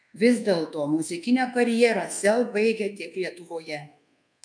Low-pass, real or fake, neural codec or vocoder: 9.9 kHz; fake; codec, 24 kHz, 1.2 kbps, DualCodec